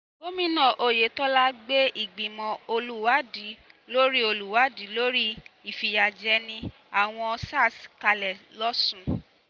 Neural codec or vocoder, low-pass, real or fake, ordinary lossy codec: none; none; real; none